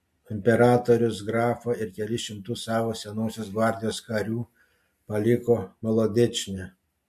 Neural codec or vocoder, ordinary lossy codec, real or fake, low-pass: none; MP3, 64 kbps; real; 14.4 kHz